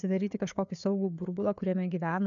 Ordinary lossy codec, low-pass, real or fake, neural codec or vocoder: MP3, 96 kbps; 7.2 kHz; fake; codec, 16 kHz, 4 kbps, FreqCodec, larger model